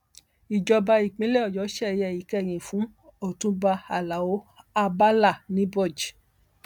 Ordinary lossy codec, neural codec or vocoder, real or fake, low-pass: none; none; real; 19.8 kHz